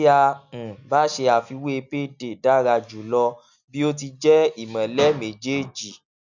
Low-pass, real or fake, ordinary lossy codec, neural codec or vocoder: 7.2 kHz; real; none; none